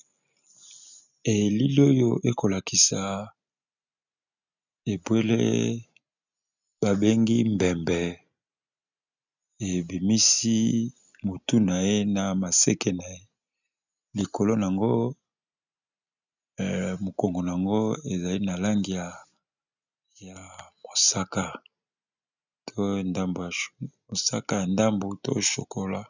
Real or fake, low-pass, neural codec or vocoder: real; 7.2 kHz; none